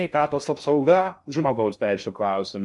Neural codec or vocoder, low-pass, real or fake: codec, 16 kHz in and 24 kHz out, 0.6 kbps, FocalCodec, streaming, 2048 codes; 10.8 kHz; fake